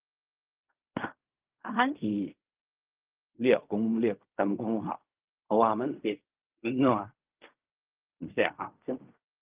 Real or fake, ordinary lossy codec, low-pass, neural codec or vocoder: fake; Opus, 32 kbps; 3.6 kHz; codec, 16 kHz in and 24 kHz out, 0.4 kbps, LongCat-Audio-Codec, fine tuned four codebook decoder